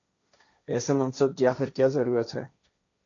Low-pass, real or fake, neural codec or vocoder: 7.2 kHz; fake; codec, 16 kHz, 1.1 kbps, Voila-Tokenizer